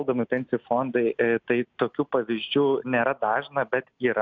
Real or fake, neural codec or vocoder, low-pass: real; none; 7.2 kHz